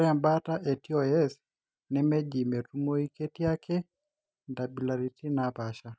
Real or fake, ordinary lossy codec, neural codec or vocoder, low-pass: real; none; none; none